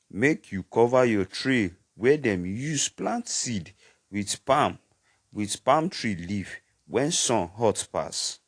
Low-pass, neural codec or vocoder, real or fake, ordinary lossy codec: 9.9 kHz; none; real; AAC, 48 kbps